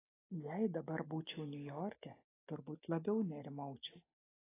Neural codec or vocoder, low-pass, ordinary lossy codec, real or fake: none; 3.6 kHz; AAC, 16 kbps; real